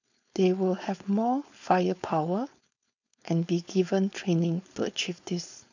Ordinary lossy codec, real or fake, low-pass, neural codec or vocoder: none; fake; 7.2 kHz; codec, 16 kHz, 4.8 kbps, FACodec